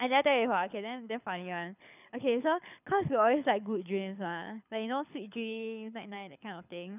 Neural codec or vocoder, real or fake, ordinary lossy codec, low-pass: codec, 24 kHz, 6 kbps, HILCodec; fake; none; 3.6 kHz